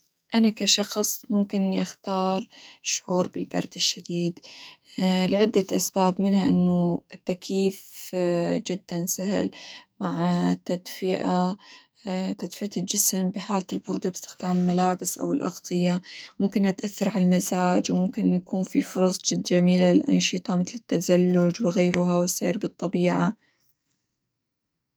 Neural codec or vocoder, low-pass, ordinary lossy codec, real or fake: codec, 44.1 kHz, 2.6 kbps, SNAC; none; none; fake